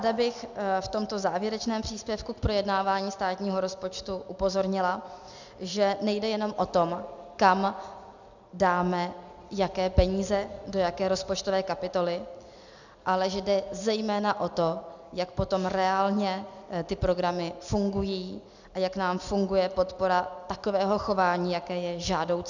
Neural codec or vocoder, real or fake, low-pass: none; real; 7.2 kHz